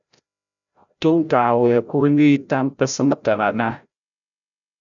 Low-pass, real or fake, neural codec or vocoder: 7.2 kHz; fake; codec, 16 kHz, 0.5 kbps, FreqCodec, larger model